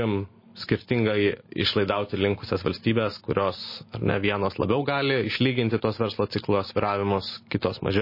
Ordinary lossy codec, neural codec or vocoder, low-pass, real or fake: MP3, 24 kbps; none; 5.4 kHz; real